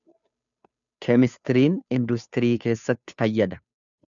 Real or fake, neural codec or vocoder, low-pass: fake; codec, 16 kHz, 2 kbps, FunCodec, trained on Chinese and English, 25 frames a second; 7.2 kHz